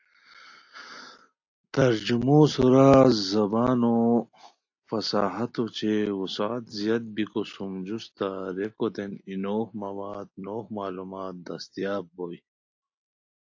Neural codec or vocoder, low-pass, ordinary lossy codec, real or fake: none; 7.2 kHz; AAC, 48 kbps; real